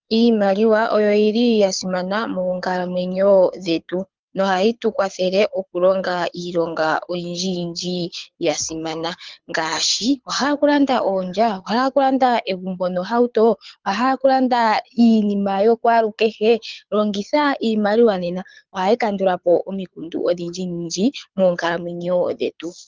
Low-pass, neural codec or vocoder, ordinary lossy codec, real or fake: 7.2 kHz; codec, 24 kHz, 6 kbps, HILCodec; Opus, 24 kbps; fake